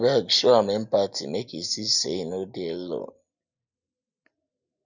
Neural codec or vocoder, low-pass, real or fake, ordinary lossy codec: none; 7.2 kHz; real; none